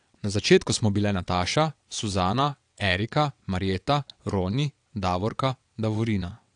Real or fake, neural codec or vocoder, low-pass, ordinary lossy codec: fake; vocoder, 22.05 kHz, 80 mel bands, WaveNeXt; 9.9 kHz; AAC, 64 kbps